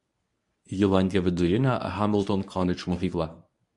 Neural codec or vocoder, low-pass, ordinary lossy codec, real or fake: codec, 24 kHz, 0.9 kbps, WavTokenizer, medium speech release version 1; 10.8 kHz; Opus, 64 kbps; fake